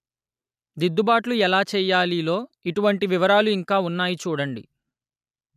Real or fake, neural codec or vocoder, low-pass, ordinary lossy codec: real; none; 14.4 kHz; none